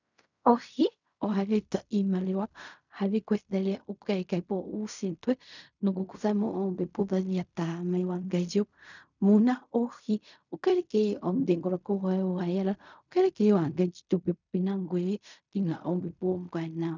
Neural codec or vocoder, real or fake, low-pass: codec, 16 kHz in and 24 kHz out, 0.4 kbps, LongCat-Audio-Codec, fine tuned four codebook decoder; fake; 7.2 kHz